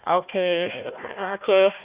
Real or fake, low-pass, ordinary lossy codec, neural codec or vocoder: fake; 3.6 kHz; Opus, 64 kbps; codec, 16 kHz, 1 kbps, FunCodec, trained on Chinese and English, 50 frames a second